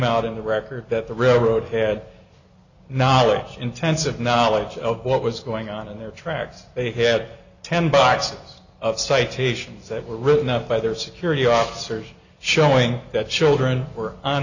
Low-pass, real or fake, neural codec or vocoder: 7.2 kHz; real; none